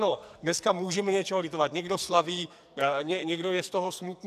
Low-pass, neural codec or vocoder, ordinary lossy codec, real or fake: 14.4 kHz; codec, 44.1 kHz, 2.6 kbps, SNAC; AAC, 96 kbps; fake